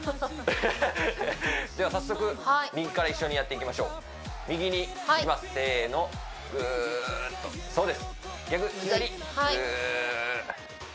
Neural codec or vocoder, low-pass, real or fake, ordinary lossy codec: none; none; real; none